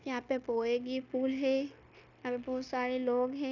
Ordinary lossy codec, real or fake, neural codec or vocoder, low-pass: none; fake; codec, 16 kHz, 0.9 kbps, LongCat-Audio-Codec; 7.2 kHz